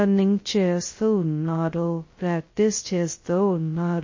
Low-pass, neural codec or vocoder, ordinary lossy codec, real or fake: 7.2 kHz; codec, 16 kHz, 0.2 kbps, FocalCodec; MP3, 32 kbps; fake